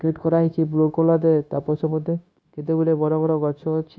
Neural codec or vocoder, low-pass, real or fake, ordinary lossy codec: codec, 16 kHz, 0.9 kbps, LongCat-Audio-Codec; none; fake; none